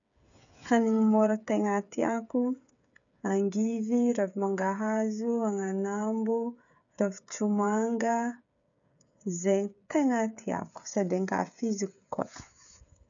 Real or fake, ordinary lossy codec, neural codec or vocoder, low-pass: fake; none; codec, 16 kHz, 8 kbps, FreqCodec, smaller model; 7.2 kHz